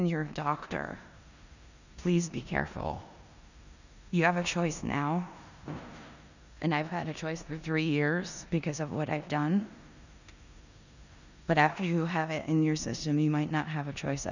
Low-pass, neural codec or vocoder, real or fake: 7.2 kHz; codec, 16 kHz in and 24 kHz out, 0.9 kbps, LongCat-Audio-Codec, four codebook decoder; fake